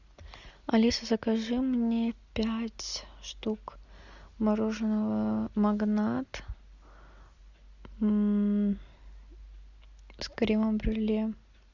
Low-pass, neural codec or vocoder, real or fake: 7.2 kHz; none; real